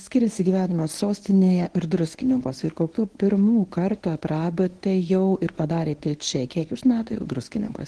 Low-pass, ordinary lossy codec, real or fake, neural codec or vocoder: 10.8 kHz; Opus, 16 kbps; fake; codec, 24 kHz, 0.9 kbps, WavTokenizer, medium speech release version 1